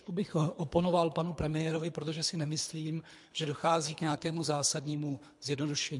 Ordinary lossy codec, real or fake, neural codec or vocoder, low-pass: MP3, 64 kbps; fake; codec, 24 kHz, 3 kbps, HILCodec; 10.8 kHz